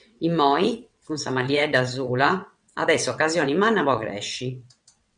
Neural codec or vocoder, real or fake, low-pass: vocoder, 22.05 kHz, 80 mel bands, WaveNeXt; fake; 9.9 kHz